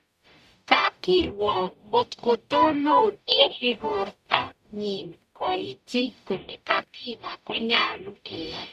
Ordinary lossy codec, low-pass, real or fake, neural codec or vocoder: none; 14.4 kHz; fake; codec, 44.1 kHz, 0.9 kbps, DAC